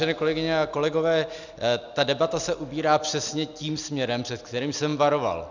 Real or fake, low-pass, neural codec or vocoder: real; 7.2 kHz; none